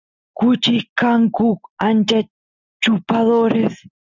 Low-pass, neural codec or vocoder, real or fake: 7.2 kHz; none; real